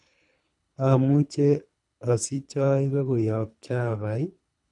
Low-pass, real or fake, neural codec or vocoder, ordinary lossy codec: 10.8 kHz; fake; codec, 24 kHz, 3 kbps, HILCodec; none